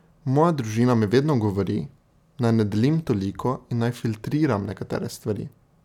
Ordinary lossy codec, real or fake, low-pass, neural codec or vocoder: none; real; 19.8 kHz; none